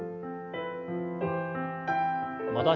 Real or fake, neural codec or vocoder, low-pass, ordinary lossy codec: real; none; 7.2 kHz; none